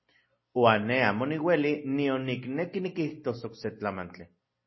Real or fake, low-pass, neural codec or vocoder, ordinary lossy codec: real; 7.2 kHz; none; MP3, 24 kbps